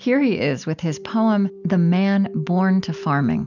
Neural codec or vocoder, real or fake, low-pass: none; real; 7.2 kHz